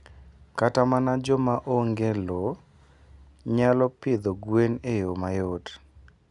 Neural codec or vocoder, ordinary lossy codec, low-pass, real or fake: none; none; 10.8 kHz; real